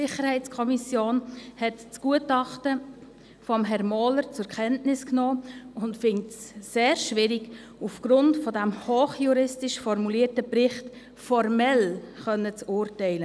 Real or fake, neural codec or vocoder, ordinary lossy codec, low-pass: real; none; none; none